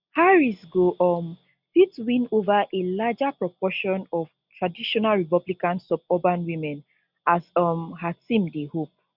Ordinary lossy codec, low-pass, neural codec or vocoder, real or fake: none; 5.4 kHz; none; real